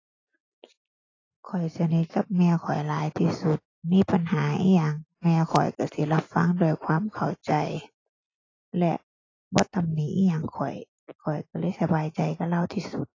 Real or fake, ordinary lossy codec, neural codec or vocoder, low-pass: real; AAC, 32 kbps; none; 7.2 kHz